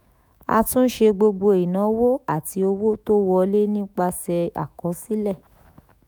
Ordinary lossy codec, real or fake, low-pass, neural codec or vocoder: none; fake; none; autoencoder, 48 kHz, 128 numbers a frame, DAC-VAE, trained on Japanese speech